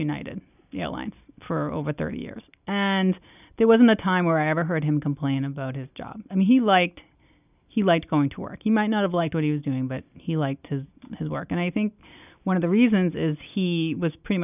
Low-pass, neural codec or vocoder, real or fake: 3.6 kHz; none; real